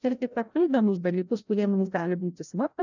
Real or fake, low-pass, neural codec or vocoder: fake; 7.2 kHz; codec, 16 kHz, 0.5 kbps, FreqCodec, larger model